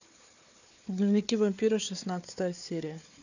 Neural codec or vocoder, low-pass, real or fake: codec, 16 kHz, 4 kbps, FunCodec, trained on Chinese and English, 50 frames a second; 7.2 kHz; fake